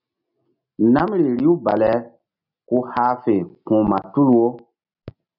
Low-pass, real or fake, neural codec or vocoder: 5.4 kHz; real; none